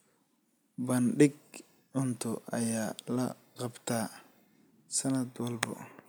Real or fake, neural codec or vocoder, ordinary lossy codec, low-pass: real; none; none; none